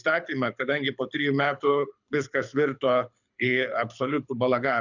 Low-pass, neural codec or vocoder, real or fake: 7.2 kHz; codec, 24 kHz, 6 kbps, HILCodec; fake